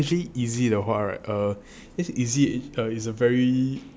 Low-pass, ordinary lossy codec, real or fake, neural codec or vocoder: none; none; real; none